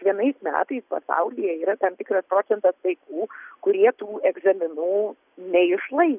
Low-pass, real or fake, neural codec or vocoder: 3.6 kHz; real; none